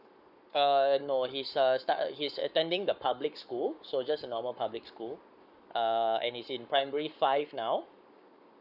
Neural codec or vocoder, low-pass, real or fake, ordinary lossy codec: codec, 44.1 kHz, 7.8 kbps, Pupu-Codec; 5.4 kHz; fake; none